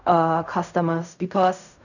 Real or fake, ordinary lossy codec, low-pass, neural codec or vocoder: fake; none; 7.2 kHz; codec, 16 kHz in and 24 kHz out, 0.4 kbps, LongCat-Audio-Codec, fine tuned four codebook decoder